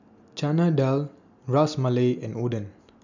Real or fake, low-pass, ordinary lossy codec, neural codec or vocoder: real; 7.2 kHz; none; none